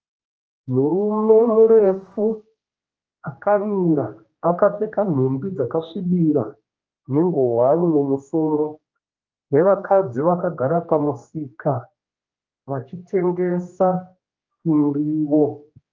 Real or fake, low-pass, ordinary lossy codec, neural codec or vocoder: fake; 7.2 kHz; Opus, 24 kbps; codec, 16 kHz, 1 kbps, X-Codec, HuBERT features, trained on general audio